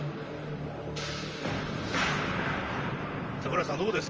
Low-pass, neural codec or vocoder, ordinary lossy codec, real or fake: 7.2 kHz; vocoder, 44.1 kHz, 128 mel bands, Pupu-Vocoder; Opus, 24 kbps; fake